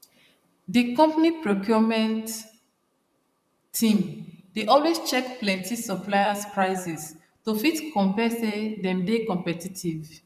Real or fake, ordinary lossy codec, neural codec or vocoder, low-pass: fake; none; vocoder, 44.1 kHz, 128 mel bands, Pupu-Vocoder; 14.4 kHz